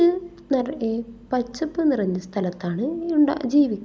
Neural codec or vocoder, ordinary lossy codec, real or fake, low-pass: none; none; real; none